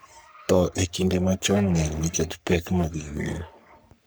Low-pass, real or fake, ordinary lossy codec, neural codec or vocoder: none; fake; none; codec, 44.1 kHz, 3.4 kbps, Pupu-Codec